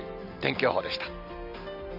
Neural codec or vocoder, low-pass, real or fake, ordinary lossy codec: none; 5.4 kHz; real; none